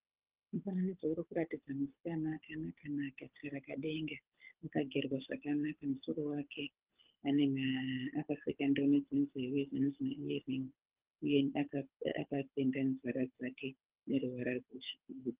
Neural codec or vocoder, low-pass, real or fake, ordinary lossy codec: codec, 24 kHz, 6 kbps, HILCodec; 3.6 kHz; fake; Opus, 32 kbps